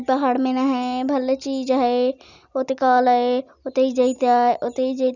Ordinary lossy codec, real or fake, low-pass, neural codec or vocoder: none; real; 7.2 kHz; none